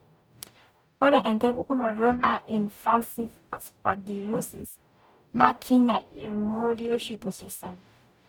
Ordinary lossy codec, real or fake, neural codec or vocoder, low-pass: none; fake; codec, 44.1 kHz, 0.9 kbps, DAC; none